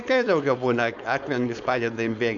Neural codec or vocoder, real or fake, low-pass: codec, 16 kHz, 4.8 kbps, FACodec; fake; 7.2 kHz